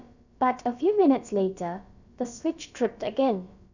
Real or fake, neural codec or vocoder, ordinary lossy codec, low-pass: fake; codec, 16 kHz, about 1 kbps, DyCAST, with the encoder's durations; none; 7.2 kHz